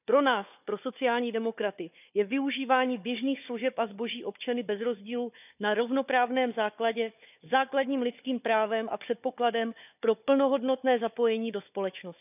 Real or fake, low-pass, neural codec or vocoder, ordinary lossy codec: fake; 3.6 kHz; codec, 16 kHz, 4 kbps, FunCodec, trained on Chinese and English, 50 frames a second; none